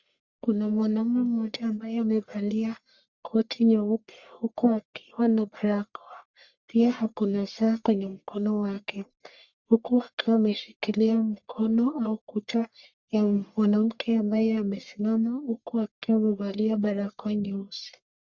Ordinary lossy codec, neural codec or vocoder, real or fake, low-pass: Opus, 64 kbps; codec, 44.1 kHz, 1.7 kbps, Pupu-Codec; fake; 7.2 kHz